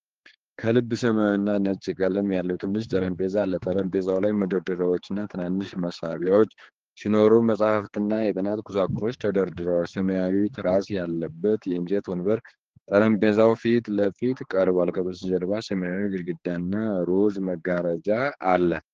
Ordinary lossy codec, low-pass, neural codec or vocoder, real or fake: Opus, 16 kbps; 7.2 kHz; codec, 16 kHz, 4 kbps, X-Codec, HuBERT features, trained on general audio; fake